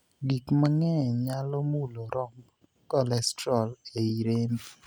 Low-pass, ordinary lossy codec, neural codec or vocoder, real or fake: none; none; none; real